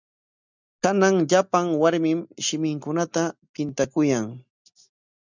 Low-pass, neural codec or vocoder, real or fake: 7.2 kHz; none; real